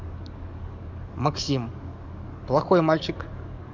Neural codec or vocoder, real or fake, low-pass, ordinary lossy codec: codec, 44.1 kHz, 7.8 kbps, DAC; fake; 7.2 kHz; none